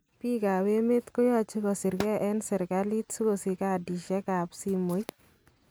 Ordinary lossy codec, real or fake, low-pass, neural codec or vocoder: none; real; none; none